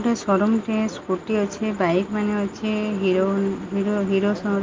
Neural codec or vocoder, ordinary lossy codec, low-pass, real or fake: none; Opus, 24 kbps; 7.2 kHz; real